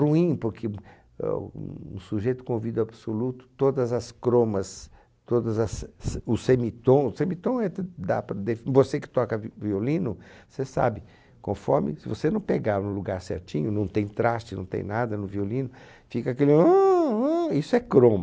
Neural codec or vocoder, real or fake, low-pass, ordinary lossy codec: none; real; none; none